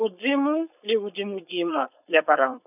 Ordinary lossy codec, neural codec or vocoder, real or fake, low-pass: none; codec, 16 kHz, 4.8 kbps, FACodec; fake; 3.6 kHz